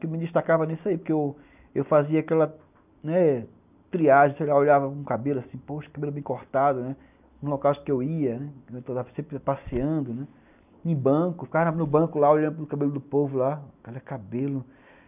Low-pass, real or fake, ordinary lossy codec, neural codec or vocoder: 3.6 kHz; real; none; none